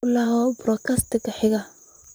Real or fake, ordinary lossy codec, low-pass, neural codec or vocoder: fake; none; none; vocoder, 44.1 kHz, 128 mel bands, Pupu-Vocoder